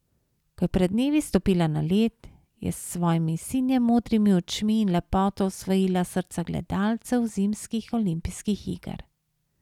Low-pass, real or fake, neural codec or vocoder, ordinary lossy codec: 19.8 kHz; real; none; none